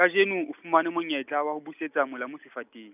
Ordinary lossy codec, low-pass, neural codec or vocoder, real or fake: none; 3.6 kHz; none; real